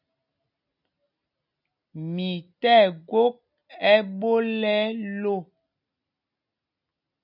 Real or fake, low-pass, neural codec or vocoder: real; 5.4 kHz; none